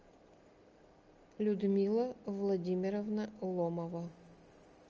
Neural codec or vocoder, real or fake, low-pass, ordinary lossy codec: none; real; 7.2 kHz; Opus, 24 kbps